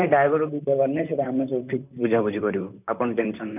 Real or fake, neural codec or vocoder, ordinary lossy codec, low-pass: fake; vocoder, 44.1 kHz, 128 mel bands, Pupu-Vocoder; none; 3.6 kHz